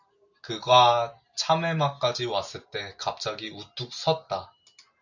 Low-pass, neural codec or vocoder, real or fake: 7.2 kHz; none; real